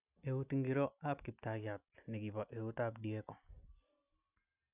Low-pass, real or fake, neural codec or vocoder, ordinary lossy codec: 3.6 kHz; real; none; none